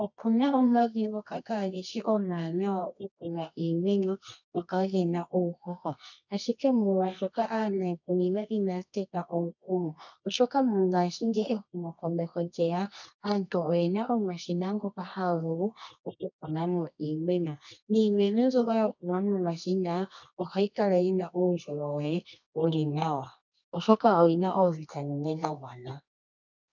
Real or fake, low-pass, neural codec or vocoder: fake; 7.2 kHz; codec, 24 kHz, 0.9 kbps, WavTokenizer, medium music audio release